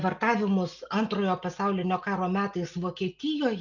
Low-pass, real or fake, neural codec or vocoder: 7.2 kHz; real; none